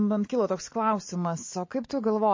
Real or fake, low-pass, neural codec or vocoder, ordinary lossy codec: real; 7.2 kHz; none; MP3, 32 kbps